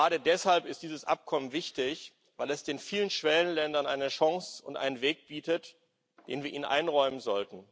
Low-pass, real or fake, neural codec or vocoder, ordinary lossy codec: none; real; none; none